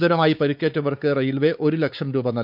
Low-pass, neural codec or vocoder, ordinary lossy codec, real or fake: 5.4 kHz; codec, 16 kHz, 4 kbps, X-Codec, WavLM features, trained on Multilingual LibriSpeech; none; fake